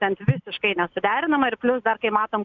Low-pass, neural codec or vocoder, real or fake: 7.2 kHz; none; real